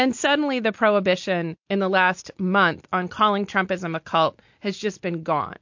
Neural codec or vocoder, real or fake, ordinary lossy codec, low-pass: none; real; MP3, 48 kbps; 7.2 kHz